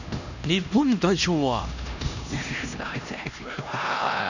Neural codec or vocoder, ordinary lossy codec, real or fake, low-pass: codec, 16 kHz, 1 kbps, X-Codec, HuBERT features, trained on LibriSpeech; none; fake; 7.2 kHz